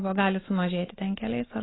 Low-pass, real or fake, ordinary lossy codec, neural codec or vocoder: 7.2 kHz; real; AAC, 16 kbps; none